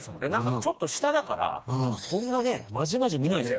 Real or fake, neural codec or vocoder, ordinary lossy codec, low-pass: fake; codec, 16 kHz, 2 kbps, FreqCodec, smaller model; none; none